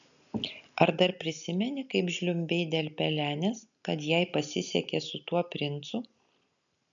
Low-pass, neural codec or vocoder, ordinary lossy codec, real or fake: 7.2 kHz; none; MP3, 96 kbps; real